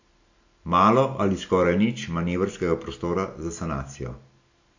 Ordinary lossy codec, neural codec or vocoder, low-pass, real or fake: AAC, 48 kbps; none; 7.2 kHz; real